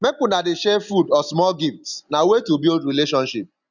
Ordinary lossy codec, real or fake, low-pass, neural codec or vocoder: none; real; 7.2 kHz; none